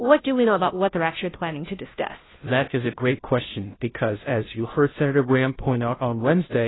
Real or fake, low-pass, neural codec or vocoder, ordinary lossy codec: fake; 7.2 kHz; codec, 16 kHz, 0.5 kbps, FunCodec, trained on Chinese and English, 25 frames a second; AAC, 16 kbps